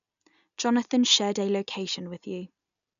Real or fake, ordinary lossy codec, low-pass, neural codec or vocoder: real; none; 7.2 kHz; none